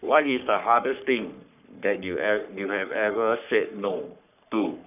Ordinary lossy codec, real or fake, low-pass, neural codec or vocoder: none; fake; 3.6 kHz; codec, 44.1 kHz, 3.4 kbps, Pupu-Codec